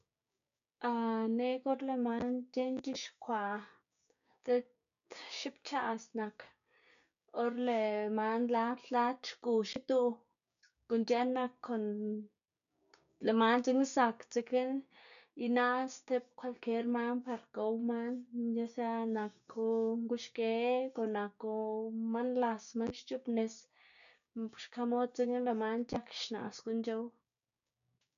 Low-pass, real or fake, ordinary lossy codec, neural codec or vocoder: 7.2 kHz; fake; none; codec, 16 kHz, 6 kbps, DAC